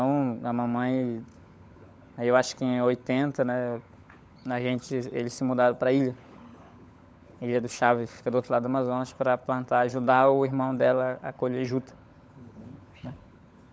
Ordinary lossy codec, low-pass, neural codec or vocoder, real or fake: none; none; codec, 16 kHz, 16 kbps, FunCodec, trained on LibriTTS, 50 frames a second; fake